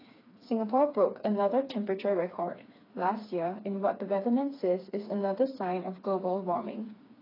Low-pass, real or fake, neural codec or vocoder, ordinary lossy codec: 5.4 kHz; fake; codec, 16 kHz, 4 kbps, FreqCodec, smaller model; AAC, 24 kbps